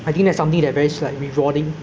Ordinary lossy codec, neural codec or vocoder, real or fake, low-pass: none; none; real; none